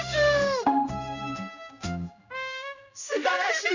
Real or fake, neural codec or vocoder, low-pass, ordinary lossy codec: fake; codec, 16 kHz, 1 kbps, X-Codec, HuBERT features, trained on general audio; 7.2 kHz; none